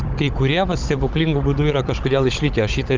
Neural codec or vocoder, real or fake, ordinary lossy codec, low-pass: codec, 16 kHz, 16 kbps, FunCodec, trained on Chinese and English, 50 frames a second; fake; Opus, 32 kbps; 7.2 kHz